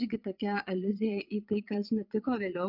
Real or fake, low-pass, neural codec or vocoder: fake; 5.4 kHz; vocoder, 22.05 kHz, 80 mel bands, Vocos